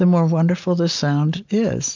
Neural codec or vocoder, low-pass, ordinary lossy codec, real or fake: none; 7.2 kHz; MP3, 64 kbps; real